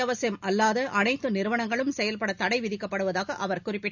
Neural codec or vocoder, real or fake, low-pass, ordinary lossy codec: none; real; 7.2 kHz; none